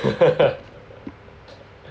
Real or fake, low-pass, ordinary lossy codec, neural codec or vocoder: real; none; none; none